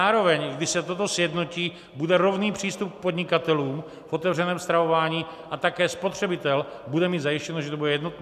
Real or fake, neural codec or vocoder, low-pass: real; none; 14.4 kHz